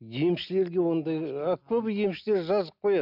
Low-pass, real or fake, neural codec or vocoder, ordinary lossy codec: 5.4 kHz; real; none; none